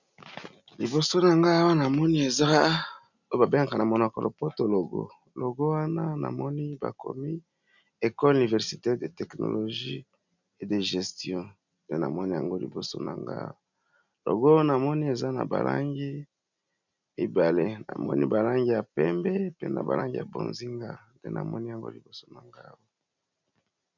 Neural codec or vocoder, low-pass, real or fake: none; 7.2 kHz; real